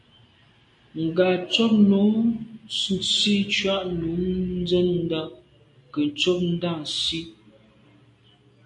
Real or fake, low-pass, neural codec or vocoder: real; 10.8 kHz; none